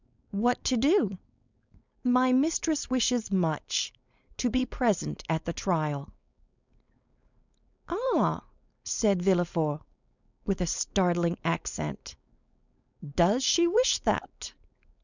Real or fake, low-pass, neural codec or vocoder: fake; 7.2 kHz; codec, 16 kHz, 4.8 kbps, FACodec